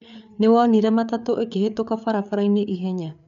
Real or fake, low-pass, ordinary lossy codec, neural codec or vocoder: fake; 7.2 kHz; none; codec, 16 kHz, 4 kbps, FreqCodec, larger model